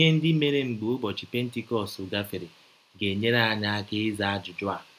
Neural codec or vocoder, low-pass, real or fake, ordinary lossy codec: none; 14.4 kHz; real; none